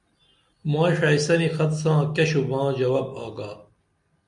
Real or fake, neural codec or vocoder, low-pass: real; none; 10.8 kHz